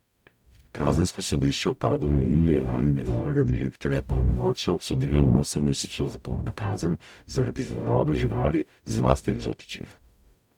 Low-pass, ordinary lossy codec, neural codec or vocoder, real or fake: 19.8 kHz; none; codec, 44.1 kHz, 0.9 kbps, DAC; fake